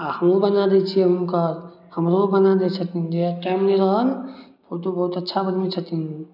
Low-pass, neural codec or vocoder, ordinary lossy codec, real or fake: 5.4 kHz; none; none; real